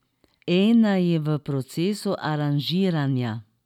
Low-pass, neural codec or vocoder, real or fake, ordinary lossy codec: 19.8 kHz; none; real; none